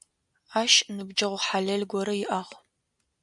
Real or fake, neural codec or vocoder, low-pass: real; none; 10.8 kHz